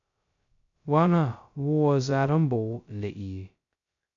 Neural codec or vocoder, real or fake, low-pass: codec, 16 kHz, 0.2 kbps, FocalCodec; fake; 7.2 kHz